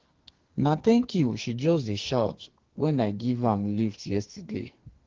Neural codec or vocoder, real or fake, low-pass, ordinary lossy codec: codec, 44.1 kHz, 2.6 kbps, SNAC; fake; 7.2 kHz; Opus, 16 kbps